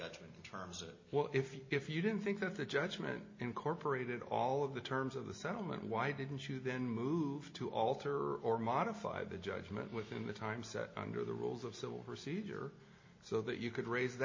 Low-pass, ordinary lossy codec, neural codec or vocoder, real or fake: 7.2 kHz; MP3, 32 kbps; none; real